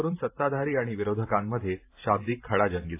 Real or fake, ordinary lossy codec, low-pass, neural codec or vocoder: fake; AAC, 24 kbps; 3.6 kHz; vocoder, 44.1 kHz, 128 mel bands every 512 samples, BigVGAN v2